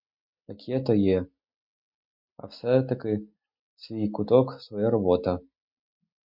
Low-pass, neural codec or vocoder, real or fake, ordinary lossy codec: 5.4 kHz; none; real; MP3, 48 kbps